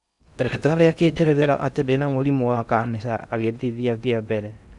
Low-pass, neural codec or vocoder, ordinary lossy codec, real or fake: 10.8 kHz; codec, 16 kHz in and 24 kHz out, 0.6 kbps, FocalCodec, streaming, 2048 codes; none; fake